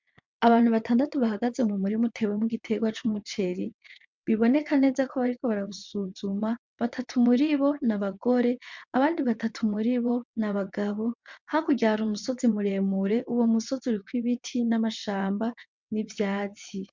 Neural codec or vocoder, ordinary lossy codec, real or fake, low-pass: vocoder, 22.05 kHz, 80 mel bands, WaveNeXt; MP3, 64 kbps; fake; 7.2 kHz